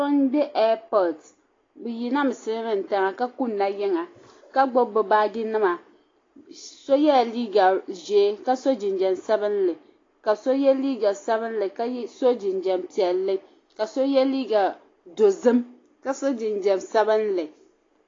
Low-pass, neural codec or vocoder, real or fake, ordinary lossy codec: 7.2 kHz; none; real; AAC, 32 kbps